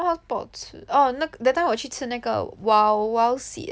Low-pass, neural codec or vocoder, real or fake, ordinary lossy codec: none; none; real; none